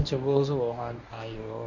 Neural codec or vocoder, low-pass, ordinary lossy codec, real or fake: codec, 24 kHz, 0.9 kbps, WavTokenizer, medium speech release version 1; 7.2 kHz; none; fake